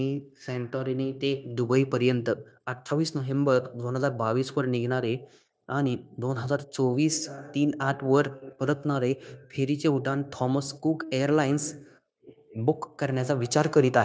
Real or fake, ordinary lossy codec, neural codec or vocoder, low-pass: fake; none; codec, 16 kHz, 0.9 kbps, LongCat-Audio-Codec; none